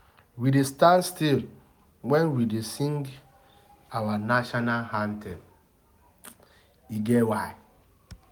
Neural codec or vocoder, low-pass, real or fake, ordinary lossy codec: none; none; real; none